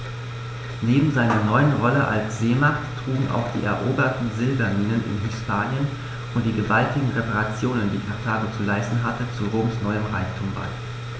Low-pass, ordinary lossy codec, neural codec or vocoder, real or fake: none; none; none; real